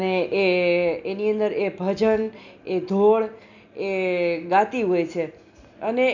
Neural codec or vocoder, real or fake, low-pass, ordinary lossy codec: none; real; 7.2 kHz; none